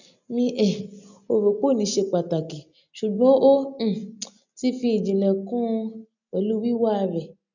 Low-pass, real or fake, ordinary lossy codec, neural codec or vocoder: 7.2 kHz; real; none; none